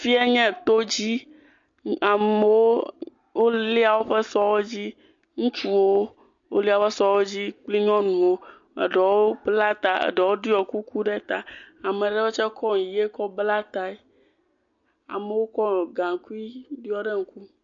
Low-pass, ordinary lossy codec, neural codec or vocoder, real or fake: 7.2 kHz; MP3, 48 kbps; none; real